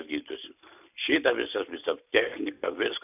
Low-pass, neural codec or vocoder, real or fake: 3.6 kHz; none; real